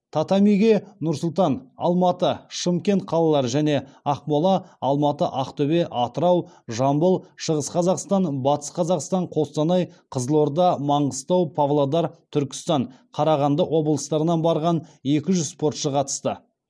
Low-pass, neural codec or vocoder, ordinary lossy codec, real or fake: none; none; none; real